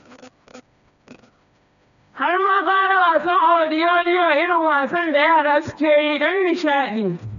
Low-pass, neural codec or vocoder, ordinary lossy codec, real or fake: 7.2 kHz; codec, 16 kHz, 2 kbps, FreqCodec, smaller model; none; fake